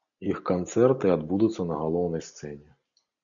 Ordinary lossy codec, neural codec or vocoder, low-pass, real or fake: MP3, 96 kbps; none; 7.2 kHz; real